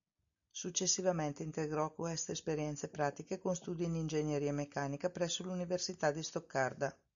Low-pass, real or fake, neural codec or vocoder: 7.2 kHz; real; none